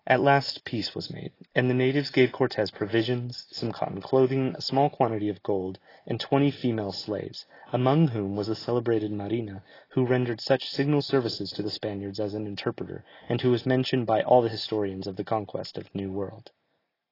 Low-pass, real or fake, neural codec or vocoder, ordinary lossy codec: 5.4 kHz; real; none; AAC, 24 kbps